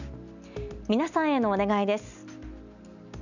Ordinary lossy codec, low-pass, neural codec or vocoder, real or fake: none; 7.2 kHz; none; real